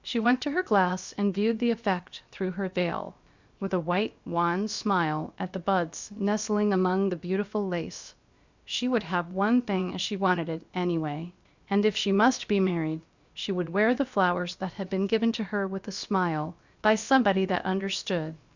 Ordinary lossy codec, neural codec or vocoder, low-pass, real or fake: Opus, 64 kbps; codec, 16 kHz, about 1 kbps, DyCAST, with the encoder's durations; 7.2 kHz; fake